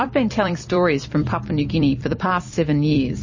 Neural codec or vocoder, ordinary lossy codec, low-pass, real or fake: vocoder, 44.1 kHz, 128 mel bands every 256 samples, BigVGAN v2; MP3, 32 kbps; 7.2 kHz; fake